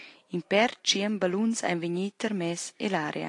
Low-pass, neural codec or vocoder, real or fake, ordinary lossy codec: 10.8 kHz; none; real; AAC, 48 kbps